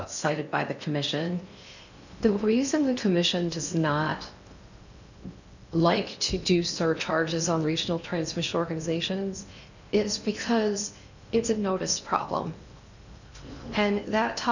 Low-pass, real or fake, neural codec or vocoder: 7.2 kHz; fake; codec, 16 kHz in and 24 kHz out, 0.6 kbps, FocalCodec, streaming, 4096 codes